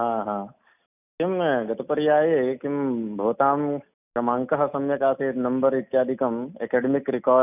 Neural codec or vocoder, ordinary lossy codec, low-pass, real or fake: none; none; 3.6 kHz; real